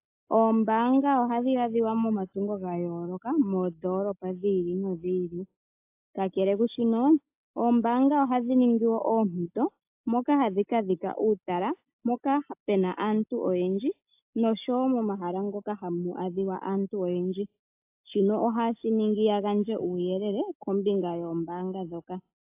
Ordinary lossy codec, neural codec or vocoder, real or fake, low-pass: AAC, 32 kbps; none; real; 3.6 kHz